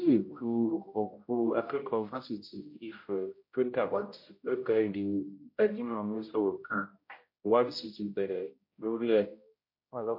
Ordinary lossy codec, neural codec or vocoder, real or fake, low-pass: MP3, 32 kbps; codec, 16 kHz, 0.5 kbps, X-Codec, HuBERT features, trained on general audio; fake; 5.4 kHz